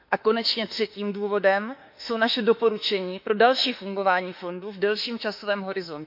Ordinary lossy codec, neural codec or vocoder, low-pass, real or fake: MP3, 48 kbps; autoencoder, 48 kHz, 32 numbers a frame, DAC-VAE, trained on Japanese speech; 5.4 kHz; fake